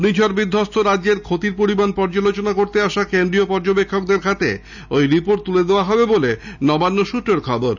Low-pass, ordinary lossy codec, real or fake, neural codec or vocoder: 7.2 kHz; none; real; none